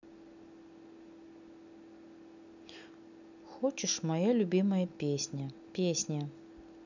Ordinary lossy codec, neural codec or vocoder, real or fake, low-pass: none; none; real; 7.2 kHz